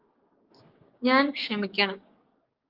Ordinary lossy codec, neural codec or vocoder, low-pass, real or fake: Opus, 32 kbps; codec, 16 kHz, 6 kbps, DAC; 5.4 kHz; fake